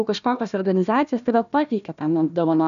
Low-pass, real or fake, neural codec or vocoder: 7.2 kHz; fake; codec, 16 kHz, 1 kbps, FunCodec, trained on Chinese and English, 50 frames a second